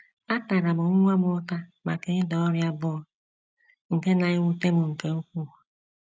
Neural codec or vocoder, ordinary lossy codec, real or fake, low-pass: none; none; real; none